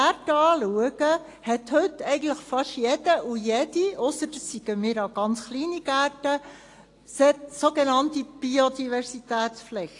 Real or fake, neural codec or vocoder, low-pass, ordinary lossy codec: real; none; 10.8 kHz; AAC, 48 kbps